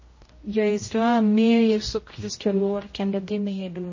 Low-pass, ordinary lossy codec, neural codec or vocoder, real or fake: 7.2 kHz; MP3, 32 kbps; codec, 16 kHz, 0.5 kbps, X-Codec, HuBERT features, trained on general audio; fake